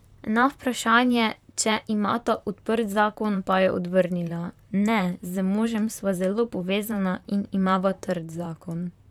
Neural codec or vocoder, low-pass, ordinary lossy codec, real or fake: vocoder, 44.1 kHz, 128 mel bands, Pupu-Vocoder; 19.8 kHz; none; fake